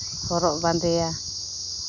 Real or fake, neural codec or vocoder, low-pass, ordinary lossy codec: real; none; 7.2 kHz; none